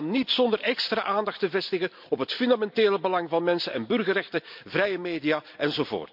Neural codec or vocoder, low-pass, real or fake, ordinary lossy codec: none; 5.4 kHz; real; MP3, 48 kbps